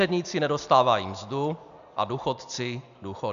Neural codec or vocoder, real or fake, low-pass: none; real; 7.2 kHz